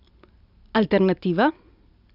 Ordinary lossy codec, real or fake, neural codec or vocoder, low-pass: none; real; none; 5.4 kHz